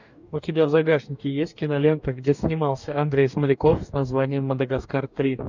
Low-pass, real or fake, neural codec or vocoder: 7.2 kHz; fake; codec, 44.1 kHz, 2.6 kbps, DAC